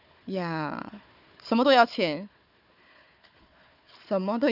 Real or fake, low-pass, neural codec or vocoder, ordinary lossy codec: fake; 5.4 kHz; codec, 16 kHz, 4 kbps, FunCodec, trained on Chinese and English, 50 frames a second; none